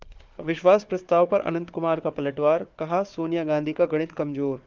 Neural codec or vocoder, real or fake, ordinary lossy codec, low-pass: codec, 16 kHz, 4 kbps, FunCodec, trained on Chinese and English, 50 frames a second; fake; Opus, 24 kbps; 7.2 kHz